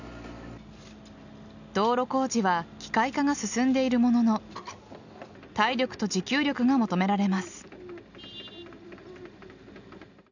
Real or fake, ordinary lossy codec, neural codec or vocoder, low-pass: real; none; none; 7.2 kHz